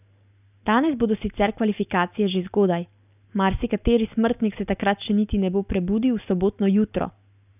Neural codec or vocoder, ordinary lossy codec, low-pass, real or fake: none; none; 3.6 kHz; real